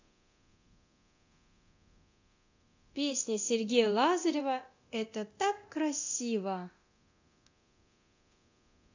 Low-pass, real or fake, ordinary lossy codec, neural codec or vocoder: 7.2 kHz; fake; AAC, 48 kbps; codec, 24 kHz, 0.9 kbps, DualCodec